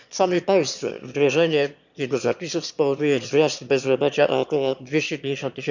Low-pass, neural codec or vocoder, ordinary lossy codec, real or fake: 7.2 kHz; autoencoder, 22.05 kHz, a latent of 192 numbers a frame, VITS, trained on one speaker; none; fake